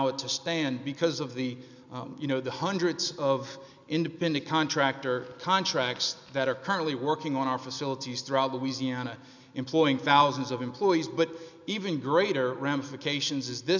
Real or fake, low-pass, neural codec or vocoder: real; 7.2 kHz; none